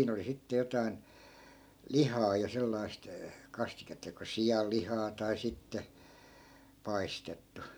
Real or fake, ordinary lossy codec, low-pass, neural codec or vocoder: real; none; none; none